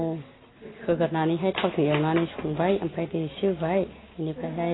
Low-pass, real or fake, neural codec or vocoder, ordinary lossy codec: 7.2 kHz; real; none; AAC, 16 kbps